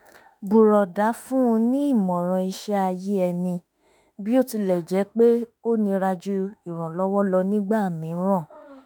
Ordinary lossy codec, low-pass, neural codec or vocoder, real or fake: none; none; autoencoder, 48 kHz, 32 numbers a frame, DAC-VAE, trained on Japanese speech; fake